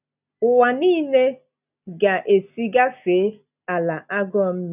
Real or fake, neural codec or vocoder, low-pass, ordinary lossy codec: real; none; 3.6 kHz; none